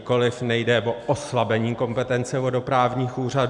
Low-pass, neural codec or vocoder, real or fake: 10.8 kHz; none; real